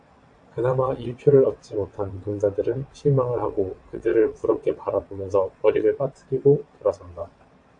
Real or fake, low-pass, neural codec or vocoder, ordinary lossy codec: fake; 9.9 kHz; vocoder, 22.05 kHz, 80 mel bands, WaveNeXt; AAC, 64 kbps